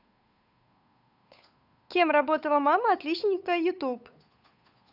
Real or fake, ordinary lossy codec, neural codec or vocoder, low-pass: fake; none; codec, 16 kHz, 8 kbps, FunCodec, trained on Chinese and English, 25 frames a second; 5.4 kHz